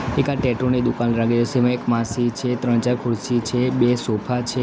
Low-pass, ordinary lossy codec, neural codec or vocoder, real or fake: none; none; none; real